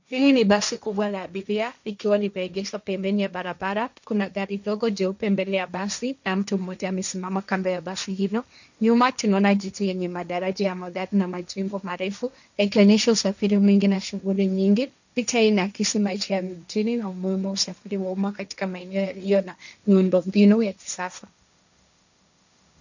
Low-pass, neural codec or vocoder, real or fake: 7.2 kHz; codec, 16 kHz, 1.1 kbps, Voila-Tokenizer; fake